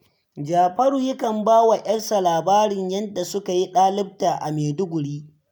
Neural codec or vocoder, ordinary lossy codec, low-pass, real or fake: none; none; none; real